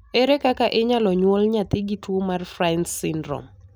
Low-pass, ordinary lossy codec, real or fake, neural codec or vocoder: none; none; real; none